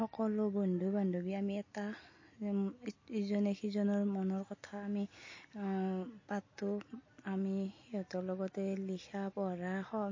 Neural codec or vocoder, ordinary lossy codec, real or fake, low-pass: none; MP3, 32 kbps; real; 7.2 kHz